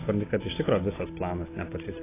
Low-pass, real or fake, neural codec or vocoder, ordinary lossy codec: 3.6 kHz; real; none; AAC, 16 kbps